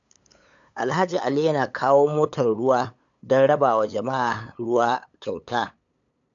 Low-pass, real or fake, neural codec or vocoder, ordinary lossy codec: 7.2 kHz; fake; codec, 16 kHz, 8 kbps, FunCodec, trained on LibriTTS, 25 frames a second; AAC, 64 kbps